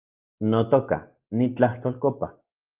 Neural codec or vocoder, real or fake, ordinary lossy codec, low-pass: none; real; Opus, 32 kbps; 3.6 kHz